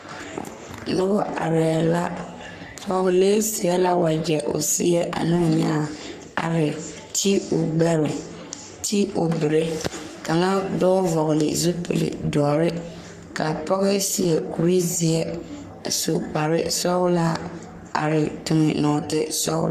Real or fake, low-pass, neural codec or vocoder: fake; 14.4 kHz; codec, 44.1 kHz, 3.4 kbps, Pupu-Codec